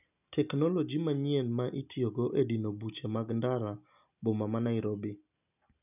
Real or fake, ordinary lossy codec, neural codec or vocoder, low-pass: real; none; none; 3.6 kHz